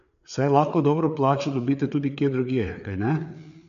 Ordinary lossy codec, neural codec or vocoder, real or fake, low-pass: none; codec, 16 kHz, 4 kbps, FreqCodec, larger model; fake; 7.2 kHz